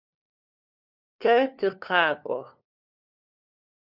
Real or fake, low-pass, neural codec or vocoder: fake; 5.4 kHz; codec, 16 kHz, 2 kbps, FunCodec, trained on LibriTTS, 25 frames a second